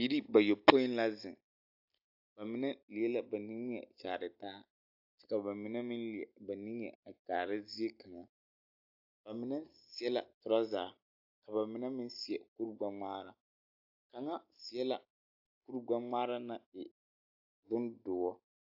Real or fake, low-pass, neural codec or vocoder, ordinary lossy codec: real; 5.4 kHz; none; AAC, 48 kbps